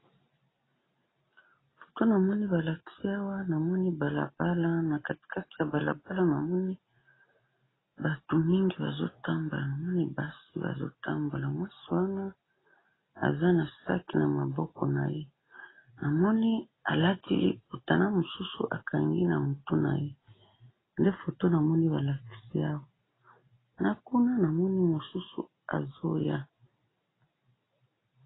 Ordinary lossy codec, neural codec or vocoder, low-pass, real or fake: AAC, 16 kbps; none; 7.2 kHz; real